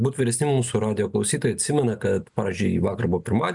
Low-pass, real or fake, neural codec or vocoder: 10.8 kHz; real; none